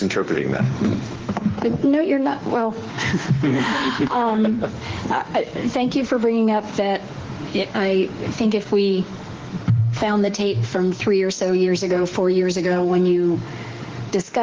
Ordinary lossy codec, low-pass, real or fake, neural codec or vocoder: Opus, 16 kbps; 7.2 kHz; fake; autoencoder, 48 kHz, 32 numbers a frame, DAC-VAE, trained on Japanese speech